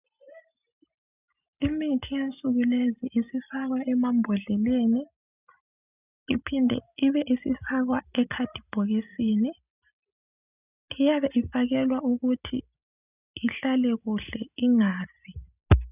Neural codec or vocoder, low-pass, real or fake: none; 3.6 kHz; real